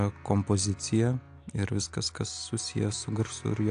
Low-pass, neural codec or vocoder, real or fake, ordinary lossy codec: 9.9 kHz; none; real; AAC, 64 kbps